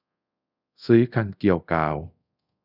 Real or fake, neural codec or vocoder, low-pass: fake; codec, 24 kHz, 0.5 kbps, DualCodec; 5.4 kHz